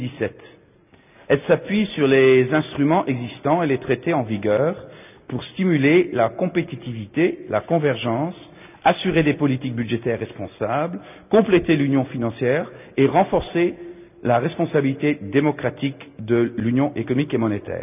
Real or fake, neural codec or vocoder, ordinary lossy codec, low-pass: real; none; none; 3.6 kHz